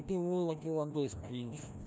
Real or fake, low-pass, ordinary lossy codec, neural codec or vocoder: fake; none; none; codec, 16 kHz, 1 kbps, FreqCodec, larger model